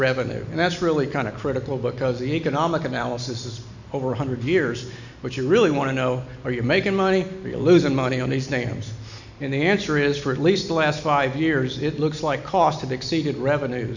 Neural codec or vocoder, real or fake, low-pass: none; real; 7.2 kHz